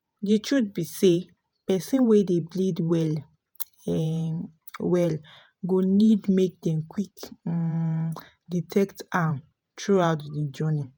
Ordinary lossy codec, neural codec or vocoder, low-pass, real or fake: none; vocoder, 48 kHz, 128 mel bands, Vocos; none; fake